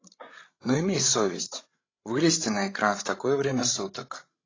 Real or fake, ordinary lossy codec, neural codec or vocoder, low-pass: fake; AAC, 32 kbps; codec, 16 kHz, 16 kbps, FreqCodec, larger model; 7.2 kHz